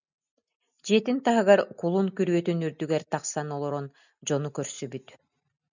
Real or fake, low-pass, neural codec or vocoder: real; 7.2 kHz; none